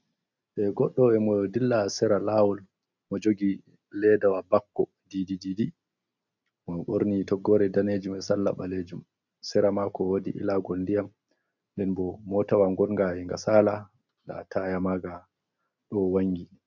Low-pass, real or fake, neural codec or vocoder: 7.2 kHz; real; none